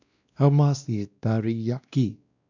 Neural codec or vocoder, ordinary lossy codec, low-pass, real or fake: codec, 16 kHz, 1 kbps, X-Codec, WavLM features, trained on Multilingual LibriSpeech; none; 7.2 kHz; fake